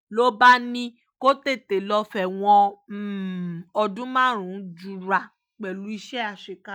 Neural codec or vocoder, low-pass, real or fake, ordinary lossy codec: none; 19.8 kHz; real; none